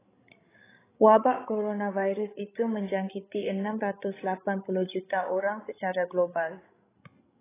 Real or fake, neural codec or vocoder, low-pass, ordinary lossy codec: fake; codec, 16 kHz, 16 kbps, FreqCodec, larger model; 3.6 kHz; AAC, 16 kbps